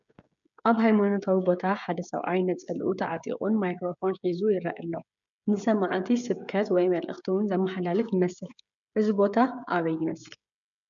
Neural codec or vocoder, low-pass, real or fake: codec, 16 kHz, 16 kbps, FreqCodec, smaller model; 7.2 kHz; fake